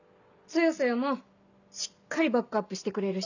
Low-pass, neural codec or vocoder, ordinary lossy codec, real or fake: 7.2 kHz; none; none; real